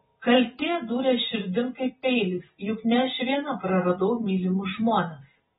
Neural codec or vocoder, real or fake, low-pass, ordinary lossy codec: none; real; 7.2 kHz; AAC, 16 kbps